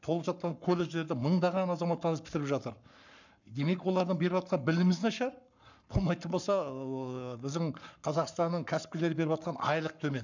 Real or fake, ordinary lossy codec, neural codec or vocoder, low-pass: fake; none; codec, 44.1 kHz, 7.8 kbps, Pupu-Codec; 7.2 kHz